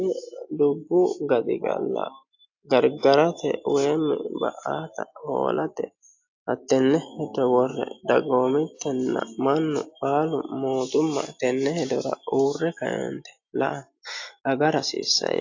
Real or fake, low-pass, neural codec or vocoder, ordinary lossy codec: real; 7.2 kHz; none; AAC, 48 kbps